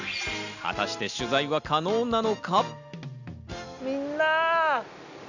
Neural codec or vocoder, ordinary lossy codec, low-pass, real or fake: none; none; 7.2 kHz; real